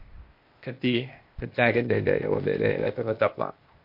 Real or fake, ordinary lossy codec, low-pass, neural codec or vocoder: fake; MP3, 32 kbps; 5.4 kHz; codec, 16 kHz, 0.8 kbps, ZipCodec